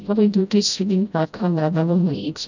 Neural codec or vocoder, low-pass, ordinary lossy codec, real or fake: codec, 16 kHz, 0.5 kbps, FreqCodec, smaller model; 7.2 kHz; none; fake